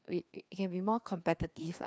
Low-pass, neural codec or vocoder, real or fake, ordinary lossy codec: none; codec, 16 kHz, 2 kbps, FreqCodec, larger model; fake; none